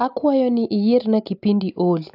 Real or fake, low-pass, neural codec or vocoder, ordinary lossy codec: real; 5.4 kHz; none; AAC, 48 kbps